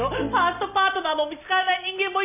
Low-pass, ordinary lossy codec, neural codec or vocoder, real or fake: 3.6 kHz; none; none; real